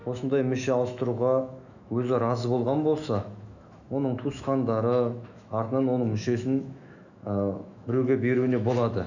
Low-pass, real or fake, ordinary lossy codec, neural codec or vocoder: 7.2 kHz; fake; none; autoencoder, 48 kHz, 128 numbers a frame, DAC-VAE, trained on Japanese speech